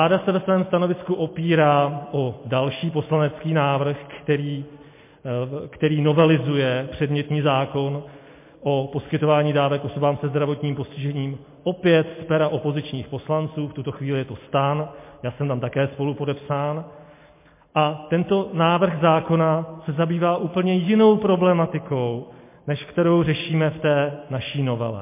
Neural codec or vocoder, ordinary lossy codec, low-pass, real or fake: none; MP3, 24 kbps; 3.6 kHz; real